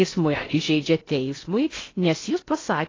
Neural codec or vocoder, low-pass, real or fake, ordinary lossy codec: codec, 16 kHz in and 24 kHz out, 0.6 kbps, FocalCodec, streaming, 4096 codes; 7.2 kHz; fake; AAC, 32 kbps